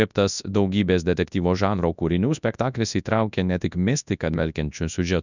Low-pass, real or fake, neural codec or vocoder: 7.2 kHz; fake; codec, 24 kHz, 0.9 kbps, WavTokenizer, large speech release